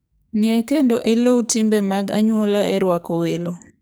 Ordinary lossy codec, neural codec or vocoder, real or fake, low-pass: none; codec, 44.1 kHz, 2.6 kbps, SNAC; fake; none